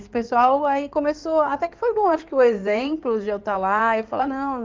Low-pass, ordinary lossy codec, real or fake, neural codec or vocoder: 7.2 kHz; Opus, 32 kbps; fake; codec, 16 kHz in and 24 kHz out, 2.2 kbps, FireRedTTS-2 codec